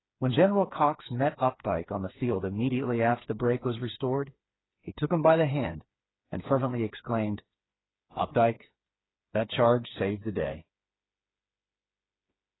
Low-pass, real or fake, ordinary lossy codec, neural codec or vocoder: 7.2 kHz; fake; AAC, 16 kbps; codec, 16 kHz, 4 kbps, FreqCodec, smaller model